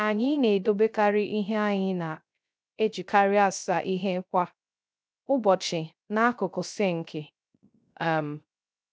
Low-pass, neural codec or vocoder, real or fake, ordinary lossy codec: none; codec, 16 kHz, 0.3 kbps, FocalCodec; fake; none